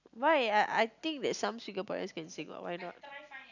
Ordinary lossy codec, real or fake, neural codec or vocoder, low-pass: none; real; none; 7.2 kHz